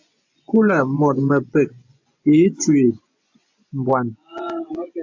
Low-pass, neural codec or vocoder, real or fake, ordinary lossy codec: 7.2 kHz; vocoder, 24 kHz, 100 mel bands, Vocos; fake; AAC, 48 kbps